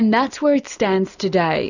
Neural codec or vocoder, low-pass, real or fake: none; 7.2 kHz; real